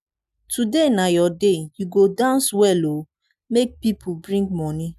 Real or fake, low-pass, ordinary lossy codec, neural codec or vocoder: real; 14.4 kHz; none; none